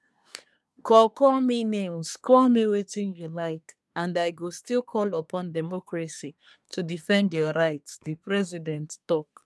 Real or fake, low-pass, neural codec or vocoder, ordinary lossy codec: fake; none; codec, 24 kHz, 1 kbps, SNAC; none